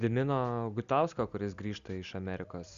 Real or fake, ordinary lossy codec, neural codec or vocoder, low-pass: real; Opus, 64 kbps; none; 7.2 kHz